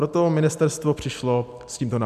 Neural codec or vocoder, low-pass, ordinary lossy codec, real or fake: none; 14.4 kHz; MP3, 96 kbps; real